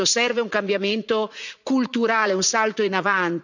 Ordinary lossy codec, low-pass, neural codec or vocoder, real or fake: none; 7.2 kHz; vocoder, 44.1 kHz, 80 mel bands, Vocos; fake